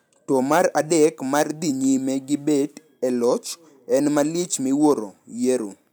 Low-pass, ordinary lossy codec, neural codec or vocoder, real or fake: none; none; none; real